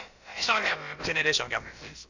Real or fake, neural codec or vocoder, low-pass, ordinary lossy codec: fake; codec, 16 kHz, about 1 kbps, DyCAST, with the encoder's durations; 7.2 kHz; AAC, 48 kbps